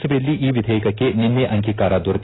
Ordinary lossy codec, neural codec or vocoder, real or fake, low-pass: AAC, 16 kbps; none; real; 7.2 kHz